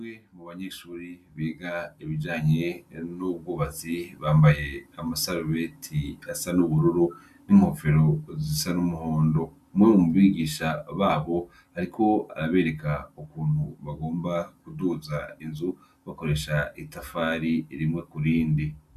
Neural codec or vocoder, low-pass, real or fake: none; 14.4 kHz; real